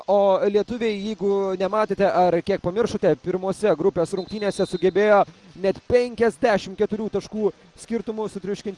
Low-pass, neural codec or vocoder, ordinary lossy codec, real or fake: 10.8 kHz; none; Opus, 32 kbps; real